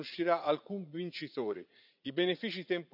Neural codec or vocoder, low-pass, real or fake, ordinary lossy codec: vocoder, 44.1 kHz, 80 mel bands, Vocos; 5.4 kHz; fake; none